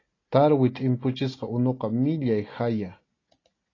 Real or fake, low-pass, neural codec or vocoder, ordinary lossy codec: real; 7.2 kHz; none; AAC, 32 kbps